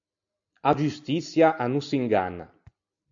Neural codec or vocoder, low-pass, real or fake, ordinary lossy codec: none; 7.2 kHz; real; AAC, 64 kbps